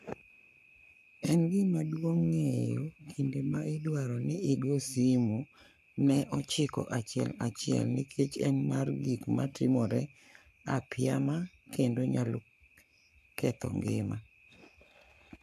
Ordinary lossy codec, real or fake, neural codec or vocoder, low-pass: MP3, 96 kbps; fake; codec, 44.1 kHz, 7.8 kbps, Pupu-Codec; 14.4 kHz